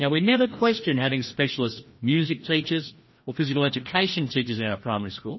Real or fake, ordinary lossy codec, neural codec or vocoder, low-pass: fake; MP3, 24 kbps; codec, 16 kHz, 1 kbps, FreqCodec, larger model; 7.2 kHz